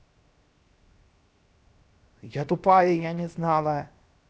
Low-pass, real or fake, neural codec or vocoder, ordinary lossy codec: none; fake; codec, 16 kHz, 0.7 kbps, FocalCodec; none